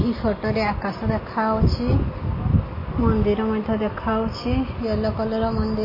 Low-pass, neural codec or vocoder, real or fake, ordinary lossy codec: 5.4 kHz; none; real; MP3, 24 kbps